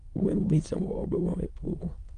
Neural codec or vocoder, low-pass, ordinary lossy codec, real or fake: autoencoder, 22.05 kHz, a latent of 192 numbers a frame, VITS, trained on many speakers; 9.9 kHz; Opus, 32 kbps; fake